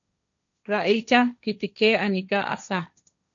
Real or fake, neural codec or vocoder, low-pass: fake; codec, 16 kHz, 1.1 kbps, Voila-Tokenizer; 7.2 kHz